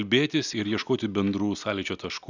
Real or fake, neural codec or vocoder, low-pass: real; none; 7.2 kHz